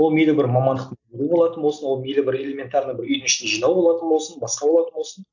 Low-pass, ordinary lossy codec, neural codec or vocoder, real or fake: 7.2 kHz; none; none; real